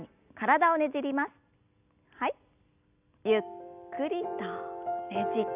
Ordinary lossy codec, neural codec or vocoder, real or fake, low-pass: none; none; real; 3.6 kHz